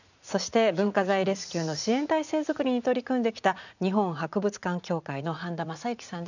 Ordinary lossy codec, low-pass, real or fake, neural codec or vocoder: none; 7.2 kHz; fake; vocoder, 44.1 kHz, 80 mel bands, Vocos